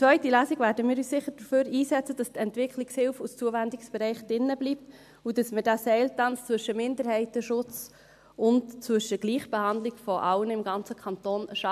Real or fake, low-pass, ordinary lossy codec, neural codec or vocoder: real; 14.4 kHz; AAC, 96 kbps; none